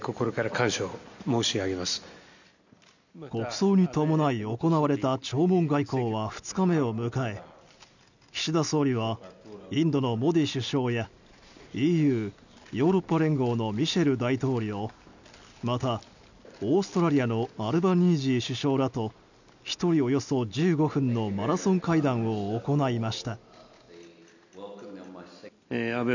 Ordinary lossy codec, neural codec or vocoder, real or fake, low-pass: none; none; real; 7.2 kHz